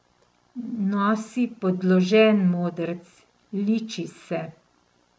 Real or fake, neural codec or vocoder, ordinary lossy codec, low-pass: real; none; none; none